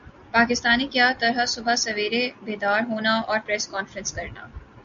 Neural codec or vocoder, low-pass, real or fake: none; 7.2 kHz; real